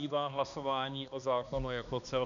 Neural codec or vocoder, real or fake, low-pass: codec, 16 kHz, 2 kbps, X-Codec, HuBERT features, trained on balanced general audio; fake; 7.2 kHz